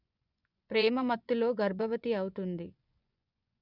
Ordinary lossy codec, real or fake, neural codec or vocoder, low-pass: none; fake; vocoder, 24 kHz, 100 mel bands, Vocos; 5.4 kHz